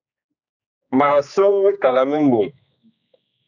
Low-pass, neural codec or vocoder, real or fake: 7.2 kHz; codec, 16 kHz, 2 kbps, X-Codec, HuBERT features, trained on general audio; fake